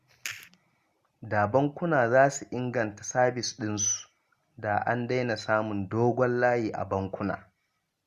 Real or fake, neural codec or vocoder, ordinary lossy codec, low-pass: real; none; Opus, 64 kbps; 14.4 kHz